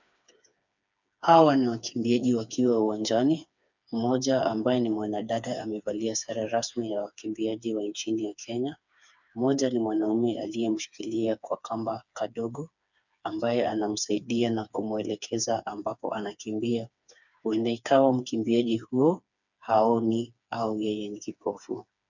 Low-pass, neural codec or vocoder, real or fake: 7.2 kHz; codec, 16 kHz, 4 kbps, FreqCodec, smaller model; fake